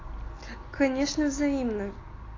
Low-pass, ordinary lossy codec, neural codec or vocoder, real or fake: 7.2 kHz; AAC, 32 kbps; none; real